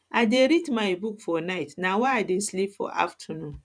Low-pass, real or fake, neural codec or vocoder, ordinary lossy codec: 9.9 kHz; fake; vocoder, 44.1 kHz, 128 mel bands every 512 samples, BigVGAN v2; none